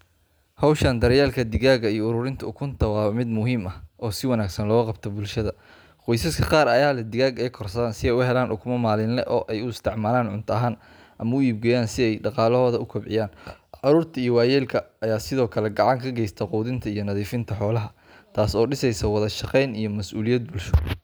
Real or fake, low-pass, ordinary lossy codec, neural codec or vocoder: real; none; none; none